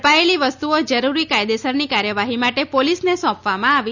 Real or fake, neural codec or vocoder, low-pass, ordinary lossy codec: real; none; 7.2 kHz; none